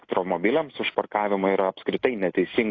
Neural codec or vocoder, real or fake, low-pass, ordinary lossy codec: none; real; 7.2 kHz; AAC, 32 kbps